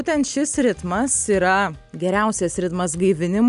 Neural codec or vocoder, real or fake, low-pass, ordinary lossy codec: none; real; 10.8 kHz; MP3, 96 kbps